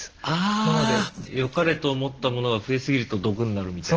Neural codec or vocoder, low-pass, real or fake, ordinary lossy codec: none; 7.2 kHz; real; Opus, 16 kbps